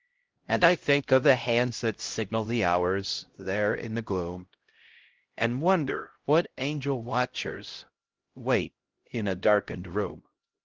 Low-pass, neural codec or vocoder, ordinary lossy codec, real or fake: 7.2 kHz; codec, 16 kHz, 0.5 kbps, X-Codec, HuBERT features, trained on LibriSpeech; Opus, 16 kbps; fake